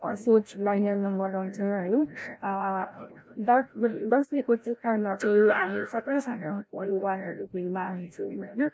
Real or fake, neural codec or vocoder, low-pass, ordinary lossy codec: fake; codec, 16 kHz, 0.5 kbps, FreqCodec, larger model; none; none